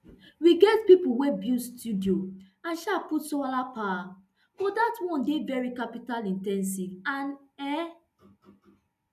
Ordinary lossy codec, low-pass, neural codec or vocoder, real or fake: none; 14.4 kHz; none; real